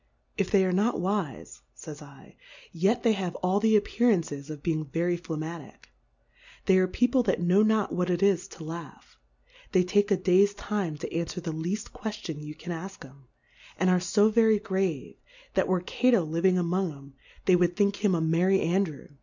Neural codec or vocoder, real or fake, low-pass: none; real; 7.2 kHz